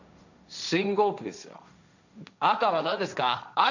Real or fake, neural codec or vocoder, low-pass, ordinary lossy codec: fake; codec, 16 kHz, 1.1 kbps, Voila-Tokenizer; 7.2 kHz; none